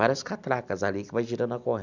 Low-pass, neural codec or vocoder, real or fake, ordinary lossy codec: 7.2 kHz; none; real; none